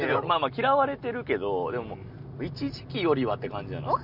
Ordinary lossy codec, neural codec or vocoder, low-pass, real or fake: none; none; 5.4 kHz; real